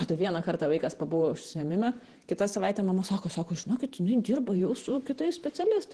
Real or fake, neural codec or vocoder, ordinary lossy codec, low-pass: fake; vocoder, 48 kHz, 128 mel bands, Vocos; Opus, 16 kbps; 10.8 kHz